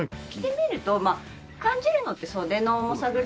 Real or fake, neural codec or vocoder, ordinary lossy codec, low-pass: real; none; none; none